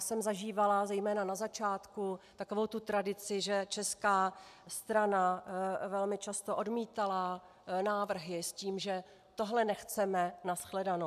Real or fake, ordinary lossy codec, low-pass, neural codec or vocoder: real; AAC, 96 kbps; 14.4 kHz; none